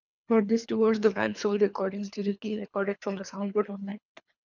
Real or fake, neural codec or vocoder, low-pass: fake; codec, 24 kHz, 3 kbps, HILCodec; 7.2 kHz